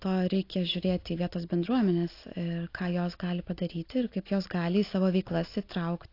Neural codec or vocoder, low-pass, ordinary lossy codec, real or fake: none; 5.4 kHz; AAC, 32 kbps; real